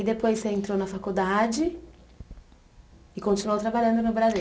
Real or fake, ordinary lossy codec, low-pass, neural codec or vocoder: real; none; none; none